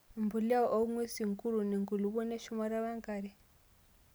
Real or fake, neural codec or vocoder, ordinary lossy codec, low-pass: real; none; none; none